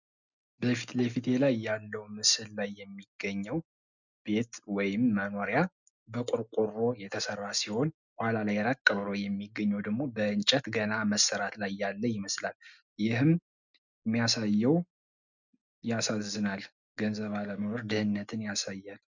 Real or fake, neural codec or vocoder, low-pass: real; none; 7.2 kHz